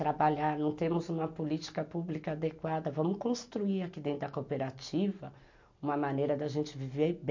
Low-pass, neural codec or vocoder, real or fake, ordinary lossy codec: 7.2 kHz; none; real; MP3, 48 kbps